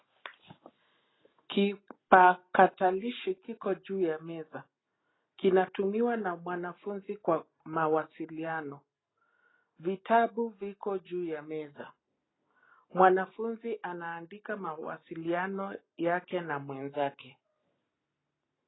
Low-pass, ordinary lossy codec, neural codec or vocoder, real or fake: 7.2 kHz; AAC, 16 kbps; none; real